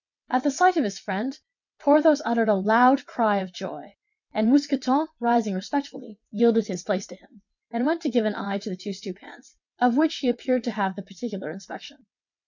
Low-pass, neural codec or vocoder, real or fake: 7.2 kHz; vocoder, 22.05 kHz, 80 mel bands, WaveNeXt; fake